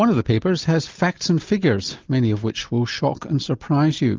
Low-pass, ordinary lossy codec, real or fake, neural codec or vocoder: 7.2 kHz; Opus, 24 kbps; real; none